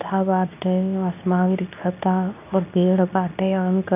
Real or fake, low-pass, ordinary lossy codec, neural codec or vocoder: fake; 3.6 kHz; none; codec, 24 kHz, 0.9 kbps, WavTokenizer, medium speech release version 2